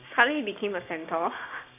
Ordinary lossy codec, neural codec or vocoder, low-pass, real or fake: AAC, 24 kbps; codec, 16 kHz, 6 kbps, DAC; 3.6 kHz; fake